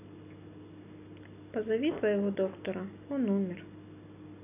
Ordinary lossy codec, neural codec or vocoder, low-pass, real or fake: none; none; 3.6 kHz; real